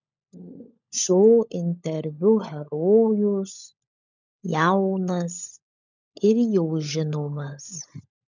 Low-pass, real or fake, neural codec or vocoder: 7.2 kHz; fake; codec, 16 kHz, 16 kbps, FunCodec, trained on LibriTTS, 50 frames a second